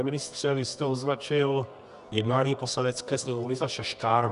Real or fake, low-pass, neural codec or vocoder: fake; 10.8 kHz; codec, 24 kHz, 0.9 kbps, WavTokenizer, medium music audio release